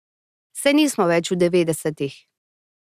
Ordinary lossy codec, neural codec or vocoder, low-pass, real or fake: Opus, 64 kbps; none; 14.4 kHz; real